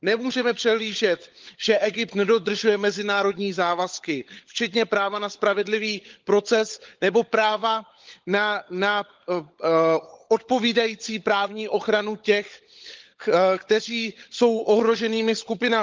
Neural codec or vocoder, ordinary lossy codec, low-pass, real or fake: codec, 16 kHz, 16 kbps, FunCodec, trained on LibriTTS, 50 frames a second; Opus, 32 kbps; 7.2 kHz; fake